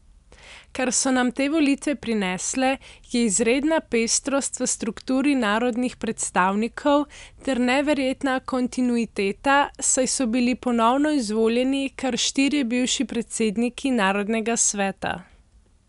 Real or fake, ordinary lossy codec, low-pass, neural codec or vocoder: real; none; 10.8 kHz; none